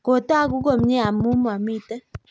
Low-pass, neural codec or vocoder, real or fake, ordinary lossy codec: none; none; real; none